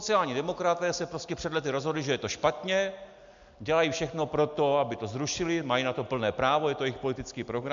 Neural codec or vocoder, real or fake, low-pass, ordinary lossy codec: none; real; 7.2 kHz; MP3, 64 kbps